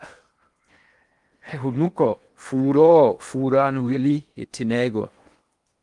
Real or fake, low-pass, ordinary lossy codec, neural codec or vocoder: fake; 10.8 kHz; Opus, 32 kbps; codec, 16 kHz in and 24 kHz out, 0.8 kbps, FocalCodec, streaming, 65536 codes